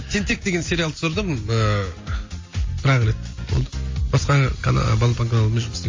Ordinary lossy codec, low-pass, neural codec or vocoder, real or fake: MP3, 32 kbps; 7.2 kHz; none; real